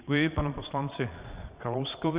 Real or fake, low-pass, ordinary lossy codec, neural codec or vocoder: fake; 3.6 kHz; Opus, 64 kbps; vocoder, 22.05 kHz, 80 mel bands, Vocos